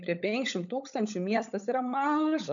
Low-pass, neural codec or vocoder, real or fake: 7.2 kHz; codec, 16 kHz, 16 kbps, FunCodec, trained on LibriTTS, 50 frames a second; fake